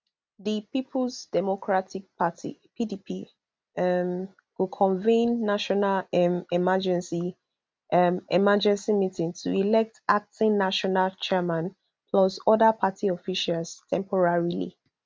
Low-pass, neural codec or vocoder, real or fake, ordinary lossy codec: none; none; real; none